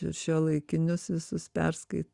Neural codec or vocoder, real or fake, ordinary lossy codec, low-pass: none; real; Opus, 64 kbps; 10.8 kHz